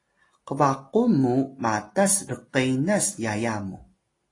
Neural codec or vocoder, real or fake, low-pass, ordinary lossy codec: none; real; 10.8 kHz; AAC, 32 kbps